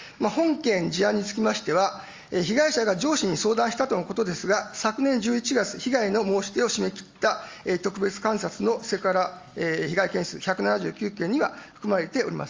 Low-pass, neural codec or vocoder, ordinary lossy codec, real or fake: 7.2 kHz; none; Opus, 32 kbps; real